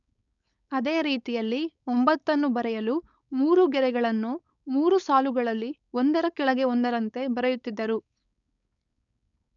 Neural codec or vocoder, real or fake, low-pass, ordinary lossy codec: codec, 16 kHz, 4.8 kbps, FACodec; fake; 7.2 kHz; none